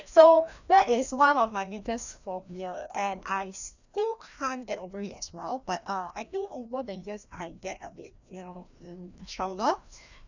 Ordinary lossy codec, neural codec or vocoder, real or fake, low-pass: none; codec, 16 kHz, 1 kbps, FreqCodec, larger model; fake; 7.2 kHz